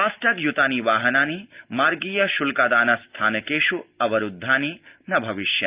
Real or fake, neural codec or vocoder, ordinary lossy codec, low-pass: real; none; Opus, 32 kbps; 3.6 kHz